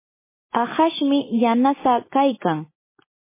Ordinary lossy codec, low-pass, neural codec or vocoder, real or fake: MP3, 16 kbps; 3.6 kHz; none; real